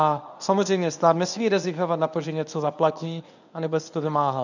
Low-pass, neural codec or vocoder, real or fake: 7.2 kHz; codec, 24 kHz, 0.9 kbps, WavTokenizer, medium speech release version 1; fake